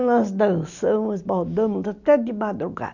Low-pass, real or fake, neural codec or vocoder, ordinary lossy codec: 7.2 kHz; real; none; none